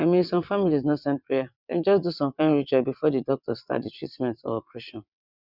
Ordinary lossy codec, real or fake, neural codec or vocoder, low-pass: none; fake; vocoder, 22.05 kHz, 80 mel bands, WaveNeXt; 5.4 kHz